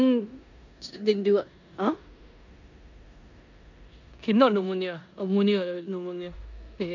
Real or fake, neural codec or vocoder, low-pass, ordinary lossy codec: fake; codec, 16 kHz in and 24 kHz out, 0.9 kbps, LongCat-Audio-Codec, four codebook decoder; 7.2 kHz; none